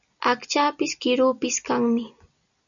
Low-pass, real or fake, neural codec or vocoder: 7.2 kHz; real; none